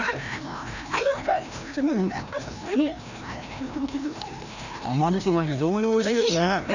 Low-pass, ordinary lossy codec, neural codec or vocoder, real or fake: 7.2 kHz; none; codec, 16 kHz, 1 kbps, FreqCodec, larger model; fake